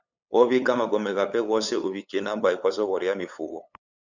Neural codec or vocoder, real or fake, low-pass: codec, 16 kHz, 8 kbps, FunCodec, trained on LibriTTS, 25 frames a second; fake; 7.2 kHz